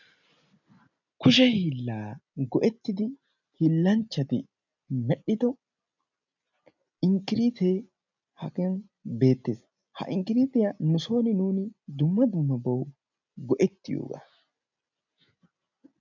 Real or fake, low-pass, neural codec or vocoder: real; 7.2 kHz; none